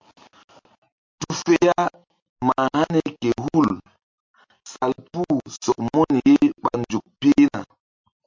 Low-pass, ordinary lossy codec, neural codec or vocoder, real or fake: 7.2 kHz; MP3, 48 kbps; none; real